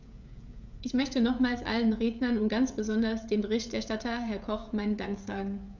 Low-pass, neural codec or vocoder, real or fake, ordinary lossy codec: 7.2 kHz; codec, 16 kHz, 16 kbps, FreqCodec, smaller model; fake; none